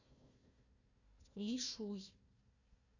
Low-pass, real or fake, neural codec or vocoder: 7.2 kHz; fake; codec, 16 kHz, 1 kbps, FunCodec, trained on Chinese and English, 50 frames a second